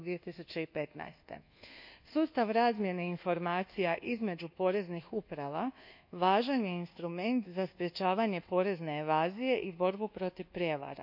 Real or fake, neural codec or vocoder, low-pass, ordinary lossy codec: fake; codec, 24 kHz, 1.2 kbps, DualCodec; 5.4 kHz; none